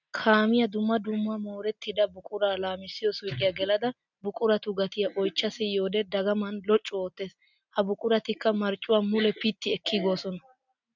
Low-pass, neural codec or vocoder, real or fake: 7.2 kHz; none; real